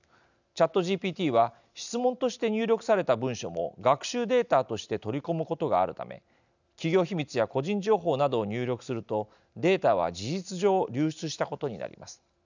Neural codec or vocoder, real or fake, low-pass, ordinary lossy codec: none; real; 7.2 kHz; none